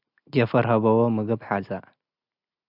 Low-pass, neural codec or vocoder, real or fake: 5.4 kHz; none; real